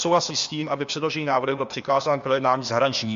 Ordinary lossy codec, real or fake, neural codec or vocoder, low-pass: MP3, 48 kbps; fake; codec, 16 kHz, 0.8 kbps, ZipCodec; 7.2 kHz